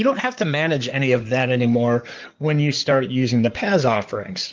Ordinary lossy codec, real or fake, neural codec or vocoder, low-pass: Opus, 24 kbps; fake; codec, 16 kHz in and 24 kHz out, 2.2 kbps, FireRedTTS-2 codec; 7.2 kHz